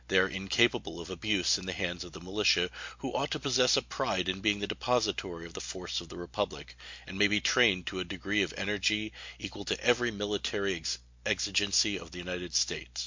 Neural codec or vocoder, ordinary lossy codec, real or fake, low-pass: none; MP3, 48 kbps; real; 7.2 kHz